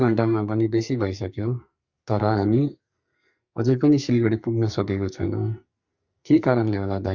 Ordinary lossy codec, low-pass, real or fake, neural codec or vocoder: Opus, 64 kbps; 7.2 kHz; fake; codec, 44.1 kHz, 2.6 kbps, SNAC